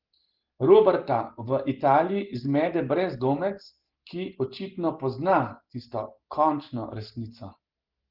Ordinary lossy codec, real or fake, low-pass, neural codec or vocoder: Opus, 16 kbps; real; 5.4 kHz; none